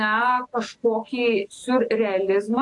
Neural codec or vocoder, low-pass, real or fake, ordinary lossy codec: none; 10.8 kHz; real; AAC, 48 kbps